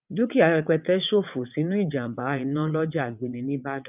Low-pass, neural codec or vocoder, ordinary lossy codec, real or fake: 3.6 kHz; vocoder, 22.05 kHz, 80 mel bands, WaveNeXt; none; fake